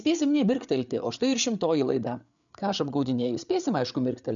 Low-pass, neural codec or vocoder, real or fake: 7.2 kHz; codec, 16 kHz, 8 kbps, FreqCodec, larger model; fake